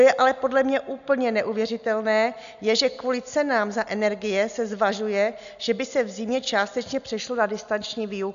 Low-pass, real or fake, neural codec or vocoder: 7.2 kHz; real; none